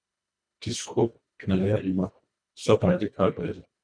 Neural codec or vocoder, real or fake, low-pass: codec, 24 kHz, 1.5 kbps, HILCodec; fake; 9.9 kHz